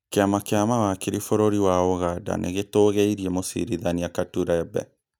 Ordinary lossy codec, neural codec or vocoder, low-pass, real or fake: none; none; none; real